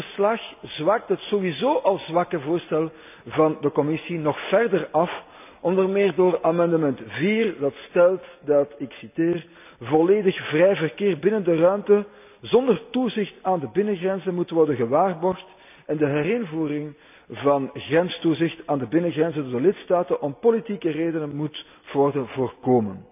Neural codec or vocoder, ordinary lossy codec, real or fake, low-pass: none; none; real; 3.6 kHz